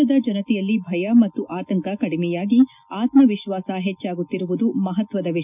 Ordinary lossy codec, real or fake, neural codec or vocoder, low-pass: none; real; none; 3.6 kHz